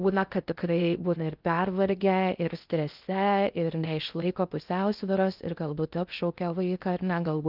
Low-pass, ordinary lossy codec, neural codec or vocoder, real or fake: 5.4 kHz; Opus, 32 kbps; codec, 16 kHz in and 24 kHz out, 0.6 kbps, FocalCodec, streaming, 2048 codes; fake